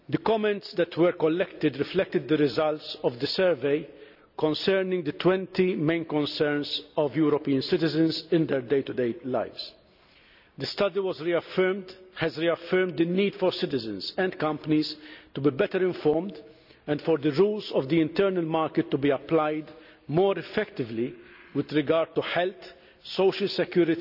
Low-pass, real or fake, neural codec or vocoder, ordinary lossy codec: 5.4 kHz; real; none; none